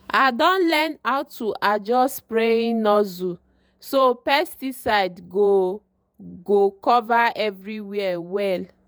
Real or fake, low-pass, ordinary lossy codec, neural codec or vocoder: fake; none; none; vocoder, 48 kHz, 128 mel bands, Vocos